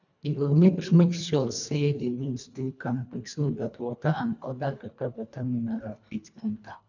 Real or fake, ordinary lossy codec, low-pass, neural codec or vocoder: fake; none; 7.2 kHz; codec, 24 kHz, 1.5 kbps, HILCodec